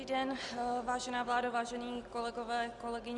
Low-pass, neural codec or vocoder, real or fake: 10.8 kHz; none; real